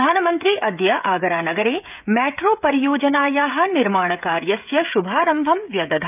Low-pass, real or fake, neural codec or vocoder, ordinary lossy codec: 3.6 kHz; fake; codec, 16 kHz, 16 kbps, FreqCodec, smaller model; none